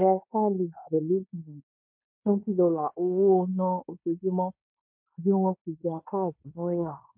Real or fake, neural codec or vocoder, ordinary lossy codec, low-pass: fake; codec, 16 kHz in and 24 kHz out, 0.9 kbps, LongCat-Audio-Codec, fine tuned four codebook decoder; MP3, 32 kbps; 3.6 kHz